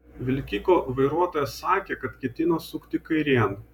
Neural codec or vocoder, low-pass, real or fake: vocoder, 48 kHz, 128 mel bands, Vocos; 19.8 kHz; fake